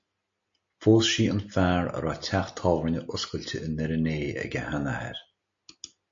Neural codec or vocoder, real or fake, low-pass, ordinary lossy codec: none; real; 7.2 kHz; MP3, 48 kbps